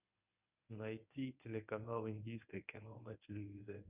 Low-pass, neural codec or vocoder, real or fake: 3.6 kHz; codec, 24 kHz, 0.9 kbps, WavTokenizer, medium speech release version 1; fake